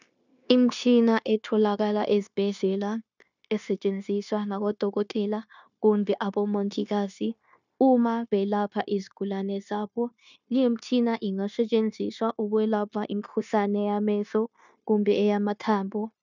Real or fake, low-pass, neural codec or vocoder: fake; 7.2 kHz; codec, 16 kHz, 0.9 kbps, LongCat-Audio-Codec